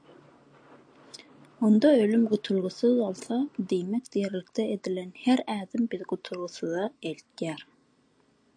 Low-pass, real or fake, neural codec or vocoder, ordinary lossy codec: 9.9 kHz; real; none; AAC, 48 kbps